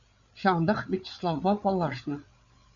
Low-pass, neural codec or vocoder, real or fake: 7.2 kHz; codec, 16 kHz, 8 kbps, FreqCodec, larger model; fake